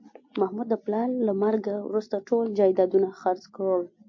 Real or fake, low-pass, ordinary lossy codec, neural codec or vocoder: real; 7.2 kHz; MP3, 48 kbps; none